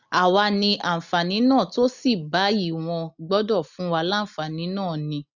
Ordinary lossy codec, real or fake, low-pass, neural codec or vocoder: none; real; 7.2 kHz; none